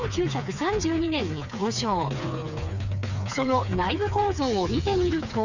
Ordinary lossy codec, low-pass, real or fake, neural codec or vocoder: none; 7.2 kHz; fake; codec, 16 kHz, 4 kbps, FreqCodec, smaller model